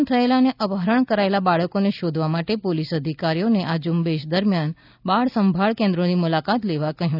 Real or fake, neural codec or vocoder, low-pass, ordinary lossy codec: real; none; 5.4 kHz; none